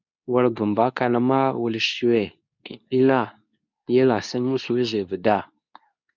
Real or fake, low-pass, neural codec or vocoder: fake; 7.2 kHz; codec, 24 kHz, 0.9 kbps, WavTokenizer, medium speech release version 2